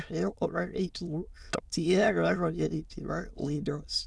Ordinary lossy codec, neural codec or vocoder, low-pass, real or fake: none; autoencoder, 22.05 kHz, a latent of 192 numbers a frame, VITS, trained on many speakers; none; fake